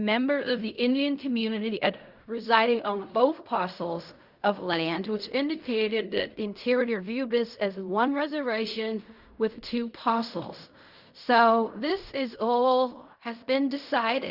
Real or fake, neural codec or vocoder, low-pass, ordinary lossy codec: fake; codec, 16 kHz in and 24 kHz out, 0.4 kbps, LongCat-Audio-Codec, fine tuned four codebook decoder; 5.4 kHz; Opus, 64 kbps